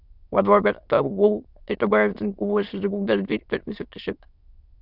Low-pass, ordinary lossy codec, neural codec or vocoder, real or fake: 5.4 kHz; Opus, 64 kbps; autoencoder, 22.05 kHz, a latent of 192 numbers a frame, VITS, trained on many speakers; fake